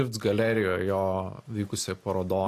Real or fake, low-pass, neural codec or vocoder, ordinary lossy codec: real; 14.4 kHz; none; AAC, 64 kbps